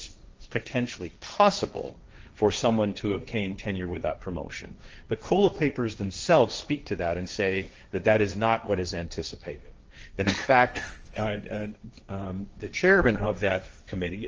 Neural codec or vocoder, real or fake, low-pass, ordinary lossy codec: codec, 16 kHz, 1.1 kbps, Voila-Tokenizer; fake; 7.2 kHz; Opus, 24 kbps